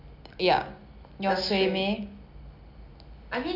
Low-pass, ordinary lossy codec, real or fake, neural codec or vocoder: 5.4 kHz; none; real; none